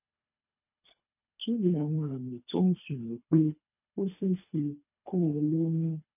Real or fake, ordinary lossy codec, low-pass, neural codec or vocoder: fake; none; 3.6 kHz; codec, 24 kHz, 3 kbps, HILCodec